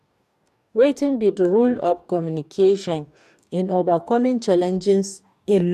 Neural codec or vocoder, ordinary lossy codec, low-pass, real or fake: codec, 44.1 kHz, 2.6 kbps, DAC; none; 14.4 kHz; fake